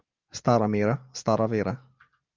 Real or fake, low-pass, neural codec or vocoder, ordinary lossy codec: real; 7.2 kHz; none; Opus, 24 kbps